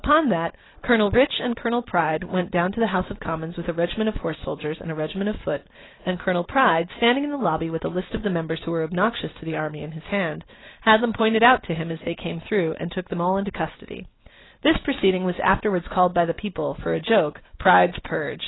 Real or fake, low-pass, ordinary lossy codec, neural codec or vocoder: real; 7.2 kHz; AAC, 16 kbps; none